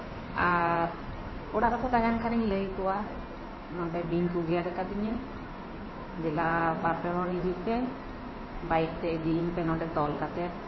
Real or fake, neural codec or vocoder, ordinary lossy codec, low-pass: fake; codec, 16 kHz in and 24 kHz out, 2.2 kbps, FireRedTTS-2 codec; MP3, 24 kbps; 7.2 kHz